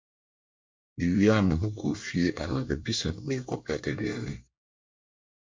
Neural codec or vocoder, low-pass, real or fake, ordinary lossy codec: codec, 24 kHz, 1 kbps, SNAC; 7.2 kHz; fake; MP3, 48 kbps